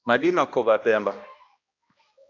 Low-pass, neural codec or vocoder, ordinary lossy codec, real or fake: 7.2 kHz; codec, 16 kHz, 1 kbps, X-Codec, HuBERT features, trained on balanced general audio; AAC, 48 kbps; fake